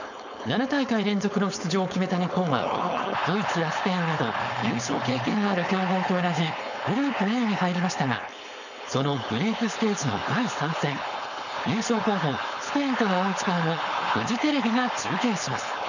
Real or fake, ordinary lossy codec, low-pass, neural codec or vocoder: fake; none; 7.2 kHz; codec, 16 kHz, 4.8 kbps, FACodec